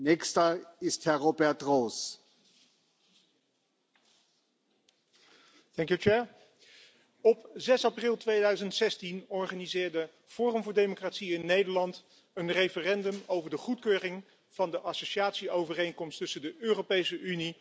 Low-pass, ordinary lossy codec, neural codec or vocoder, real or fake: none; none; none; real